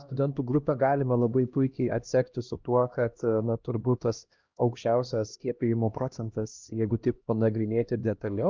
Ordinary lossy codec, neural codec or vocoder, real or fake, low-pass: Opus, 24 kbps; codec, 16 kHz, 1 kbps, X-Codec, HuBERT features, trained on LibriSpeech; fake; 7.2 kHz